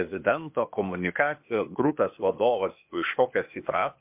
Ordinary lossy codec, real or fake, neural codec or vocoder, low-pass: MP3, 32 kbps; fake; codec, 16 kHz, 0.8 kbps, ZipCodec; 3.6 kHz